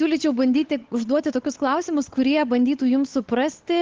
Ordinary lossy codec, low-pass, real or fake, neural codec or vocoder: Opus, 16 kbps; 7.2 kHz; fake; codec, 16 kHz, 8 kbps, FunCodec, trained on Chinese and English, 25 frames a second